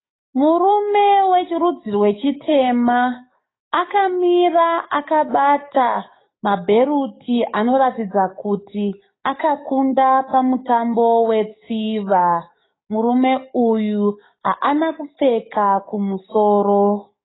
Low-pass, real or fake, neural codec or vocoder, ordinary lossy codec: 7.2 kHz; fake; codec, 44.1 kHz, 7.8 kbps, DAC; AAC, 16 kbps